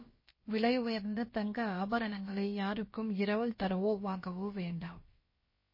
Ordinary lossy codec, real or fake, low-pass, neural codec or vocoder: MP3, 24 kbps; fake; 5.4 kHz; codec, 16 kHz, about 1 kbps, DyCAST, with the encoder's durations